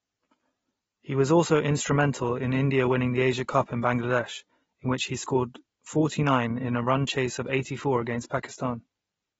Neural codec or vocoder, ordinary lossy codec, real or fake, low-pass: none; AAC, 24 kbps; real; 10.8 kHz